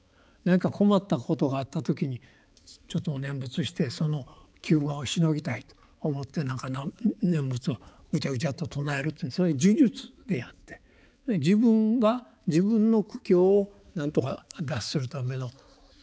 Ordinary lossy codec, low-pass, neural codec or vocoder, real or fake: none; none; codec, 16 kHz, 4 kbps, X-Codec, HuBERT features, trained on balanced general audio; fake